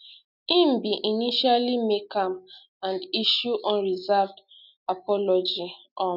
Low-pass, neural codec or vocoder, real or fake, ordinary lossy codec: 5.4 kHz; none; real; none